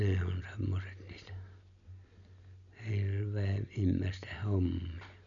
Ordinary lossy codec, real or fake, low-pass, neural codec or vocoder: none; real; 7.2 kHz; none